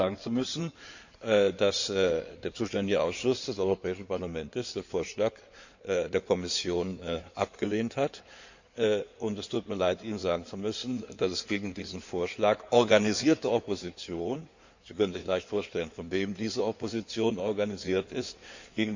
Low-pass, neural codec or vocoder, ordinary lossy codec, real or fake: 7.2 kHz; codec, 16 kHz in and 24 kHz out, 2.2 kbps, FireRedTTS-2 codec; Opus, 64 kbps; fake